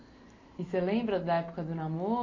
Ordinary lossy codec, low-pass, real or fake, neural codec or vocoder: AAC, 32 kbps; 7.2 kHz; real; none